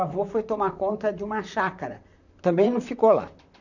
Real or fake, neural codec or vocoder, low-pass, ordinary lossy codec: fake; vocoder, 44.1 kHz, 128 mel bands, Pupu-Vocoder; 7.2 kHz; none